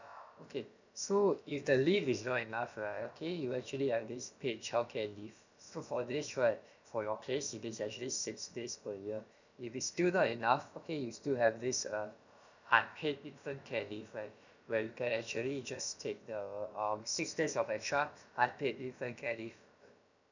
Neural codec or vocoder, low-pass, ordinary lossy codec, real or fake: codec, 16 kHz, about 1 kbps, DyCAST, with the encoder's durations; 7.2 kHz; none; fake